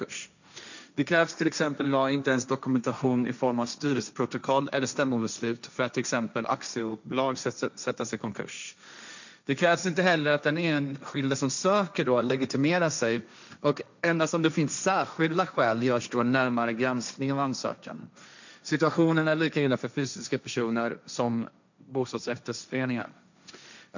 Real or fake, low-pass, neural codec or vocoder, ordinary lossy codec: fake; 7.2 kHz; codec, 16 kHz, 1.1 kbps, Voila-Tokenizer; none